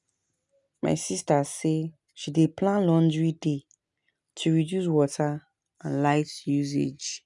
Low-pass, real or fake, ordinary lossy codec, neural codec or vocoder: 10.8 kHz; real; none; none